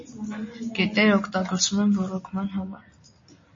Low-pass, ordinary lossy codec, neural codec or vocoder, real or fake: 7.2 kHz; MP3, 32 kbps; none; real